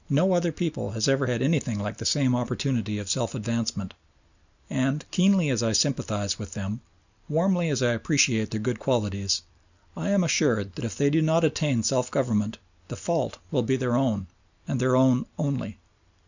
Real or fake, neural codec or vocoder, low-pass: real; none; 7.2 kHz